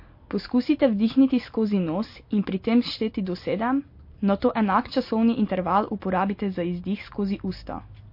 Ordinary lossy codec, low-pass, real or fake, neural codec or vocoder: MP3, 32 kbps; 5.4 kHz; real; none